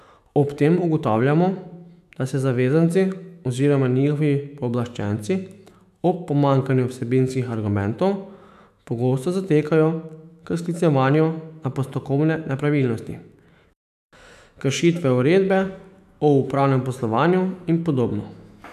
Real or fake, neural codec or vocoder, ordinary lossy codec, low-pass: fake; autoencoder, 48 kHz, 128 numbers a frame, DAC-VAE, trained on Japanese speech; none; 14.4 kHz